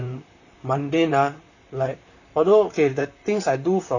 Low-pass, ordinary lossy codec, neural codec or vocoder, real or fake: 7.2 kHz; none; vocoder, 44.1 kHz, 128 mel bands, Pupu-Vocoder; fake